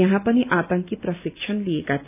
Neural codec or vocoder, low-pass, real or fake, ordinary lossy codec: none; 3.6 kHz; real; none